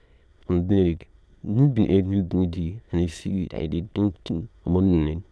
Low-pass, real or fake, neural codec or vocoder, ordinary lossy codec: none; fake; autoencoder, 22.05 kHz, a latent of 192 numbers a frame, VITS, trained on many speakers; none